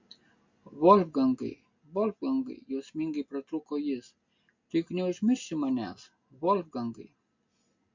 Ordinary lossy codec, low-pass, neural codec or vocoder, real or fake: MP3, 48 kbps; 7.2 kHz; none; real